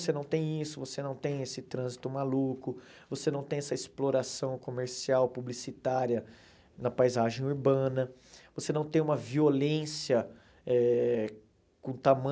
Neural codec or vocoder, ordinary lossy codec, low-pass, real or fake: none; none; none; real